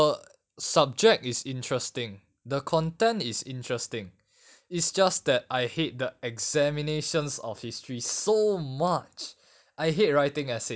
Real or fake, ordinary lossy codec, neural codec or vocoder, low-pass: real; none; none; none